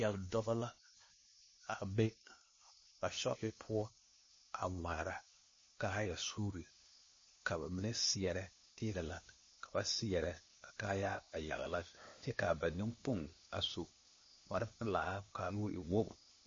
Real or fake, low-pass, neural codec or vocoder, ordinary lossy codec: fake; 7.2 kHz; codec, 16 kHz, 0.8 kbps, ZipCodec; MP3, 32 kbps